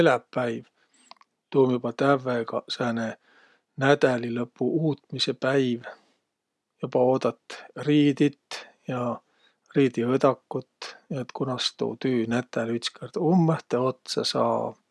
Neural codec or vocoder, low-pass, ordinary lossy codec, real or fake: none; none; none; real